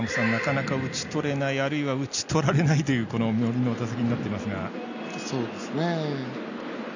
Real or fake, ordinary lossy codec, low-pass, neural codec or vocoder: real; none; 7.2 kHz; none